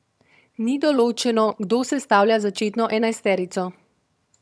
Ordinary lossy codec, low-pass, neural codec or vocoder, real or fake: none; none; vocoder, 22.05 kHz, 80 mel bands, HiFi-GAN; fake